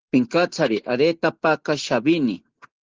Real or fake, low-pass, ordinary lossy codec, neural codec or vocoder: real; 7.2 kHz; Opus, 16 kbps; none